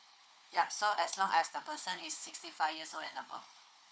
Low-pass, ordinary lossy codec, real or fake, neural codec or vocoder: none; none; fake; codec, 16 kHz, 4 kbps, FunCodec, trained on Chinese and English, 50 frames a second